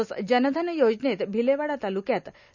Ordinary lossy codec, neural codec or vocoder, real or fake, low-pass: none; none; real; 7.2 kHz